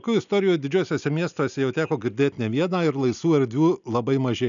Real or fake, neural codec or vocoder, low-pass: real; none; 7.2 kHz